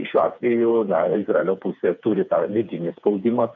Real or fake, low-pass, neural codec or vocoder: fake; 7.2 kHz; codec, 16 kHz, 4 kbps, FreqCodec, smaller model